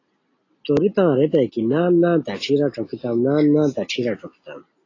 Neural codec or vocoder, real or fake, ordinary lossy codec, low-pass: none; real; AAC, 32 kbps; 7.2 kHz